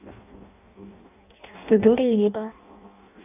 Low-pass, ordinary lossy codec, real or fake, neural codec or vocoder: 3.6 kHz; none; fake; codec, 16 kHz in and 24 kHz out, 0.6 kbps, FireRedTTS-2 codec